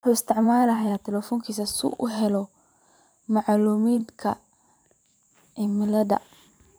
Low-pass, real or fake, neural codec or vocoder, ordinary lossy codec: none; fake; vocoder, 44.1 kHz, 128 mel bands every 512 samples, BigVGAN v2; none